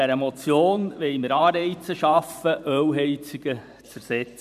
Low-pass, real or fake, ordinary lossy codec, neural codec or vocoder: 14.4 kHz; fake; none; vocoder, 44.1 kHz, 128 mel bands every 512 samples, BigVGAN v2